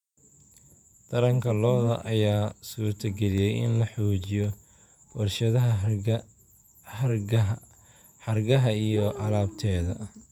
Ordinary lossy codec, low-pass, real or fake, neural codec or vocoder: none; 19.8 kHz; fake; vocoder, 48 kHz, 128 mel bands, Vocos